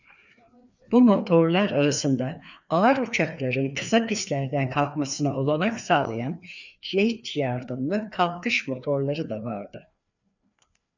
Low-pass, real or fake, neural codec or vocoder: 7.2 kHz; fake; codec, 16 kHz, 2 kbps, FreqCodec, larger model